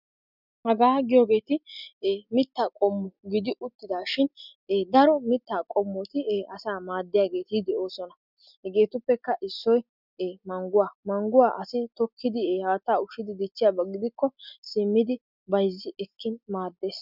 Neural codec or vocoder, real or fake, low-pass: none; real; 5.4 kHz